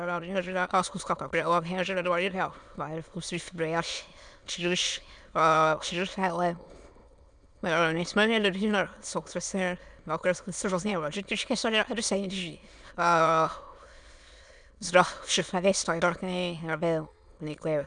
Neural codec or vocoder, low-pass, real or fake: autoencoder, 22.05 kHz, a latent of 192 numbers a frame, VITS, trained on many speakers; 9.9 kHz; fake